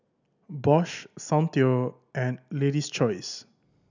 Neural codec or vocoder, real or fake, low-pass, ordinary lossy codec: none; real; 7.2 kHz; none